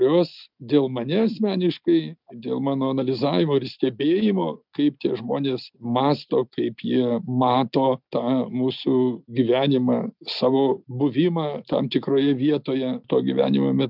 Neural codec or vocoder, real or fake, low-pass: none; real; 5.4 kHz